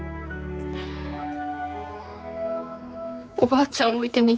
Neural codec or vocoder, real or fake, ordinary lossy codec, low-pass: codec, 16 kHz, 4 kbps, X-Codec, HuBERT features, trained on general audio; fake; none; none